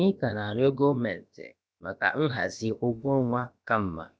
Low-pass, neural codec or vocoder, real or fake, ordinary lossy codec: none; codec, 16 kHz, about 1 kbps, DyCAST, with the encoder's durations; fake; none